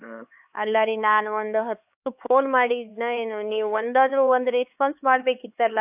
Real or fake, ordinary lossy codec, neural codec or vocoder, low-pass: fake; AAC, 32 kbps; codec, 16 kHz, 4 kbps, X-Codec, HuBERT features, trained on LibriSpeech; 3.6 kHz